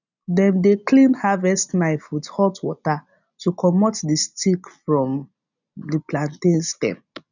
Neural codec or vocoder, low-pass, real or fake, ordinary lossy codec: none; 7.2 kHz; real; none